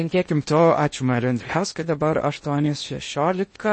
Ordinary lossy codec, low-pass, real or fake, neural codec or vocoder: MP3, 32 kbps; 9.9 kHz; fake; codec, 16 kHz in and 24 kHz out, 0.6 kbps, FocalCodec, streaming, 2048 codes